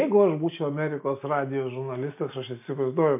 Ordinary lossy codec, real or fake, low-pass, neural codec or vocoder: MP3, 32 kbps; real; 3.6 kHz; none